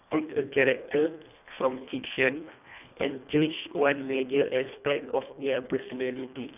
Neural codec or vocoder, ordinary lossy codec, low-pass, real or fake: codec, 24 kHz, 1.5 kbps, HILCodec; none; 3.6 kHz; fake